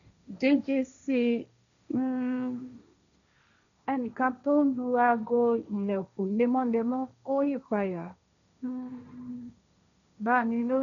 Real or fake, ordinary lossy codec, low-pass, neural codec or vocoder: fake; none; 7.2 kHz; codec, 16 kHz, 1.1 kbps, Voila-Tokenizer